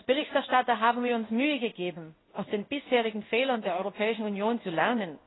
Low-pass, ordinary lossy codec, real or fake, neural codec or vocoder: 7.2 kHz; AAC, 16 kbps; fake; codec, 16 kHz in and 24 kHz out, 1 kbps, XY-Tokenizer